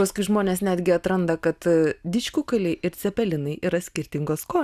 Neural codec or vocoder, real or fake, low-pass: none; real; 14.4 kHz